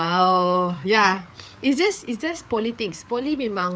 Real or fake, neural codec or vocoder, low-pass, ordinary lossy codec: fake; codec, 16 kHz, 4 kbps, FreqCodec, larger model; none; none